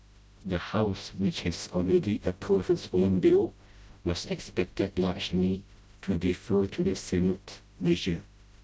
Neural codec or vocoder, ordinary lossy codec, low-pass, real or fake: codec, 16 kHz, 0.5 kbps, FreqCodec, smaller model; none; none; fake